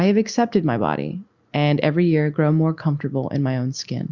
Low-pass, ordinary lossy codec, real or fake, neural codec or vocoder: 7.2 kHz; Opus, 64 kbps; real; none